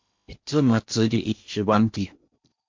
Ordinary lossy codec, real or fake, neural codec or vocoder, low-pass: MP3, 48 kbps; fake; codec, 16 kHz in and 24 kHz out, 0.8 kbps, FocalCodec, streaming, 65536 codes; 7.2 kHz